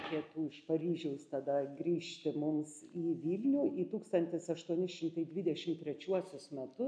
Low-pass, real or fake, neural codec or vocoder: 9.9 kHz; real; none